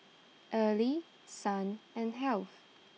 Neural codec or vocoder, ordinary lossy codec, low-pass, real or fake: none; none; none; real